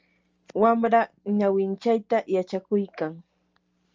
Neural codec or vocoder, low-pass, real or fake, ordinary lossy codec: none; 7.2 kHz; real; Opus, 24 kbps